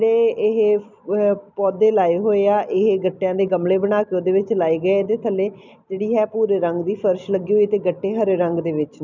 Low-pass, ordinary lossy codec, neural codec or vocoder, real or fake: 7.2 kHz; none; none; real